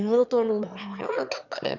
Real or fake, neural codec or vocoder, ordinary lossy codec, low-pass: fake; autoencoder, 22.05 kHz, a latent of 192 numbers a frame, VITS, trained on one speaker; none; 7.2 kHz